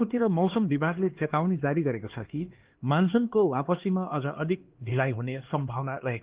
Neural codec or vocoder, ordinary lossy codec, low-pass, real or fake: codec, 16 kHz, 2 kbps, X-Codec, HuBERT features, trained on LibriSpeech; Opus, 16 kbps; 3.6 kHz; fake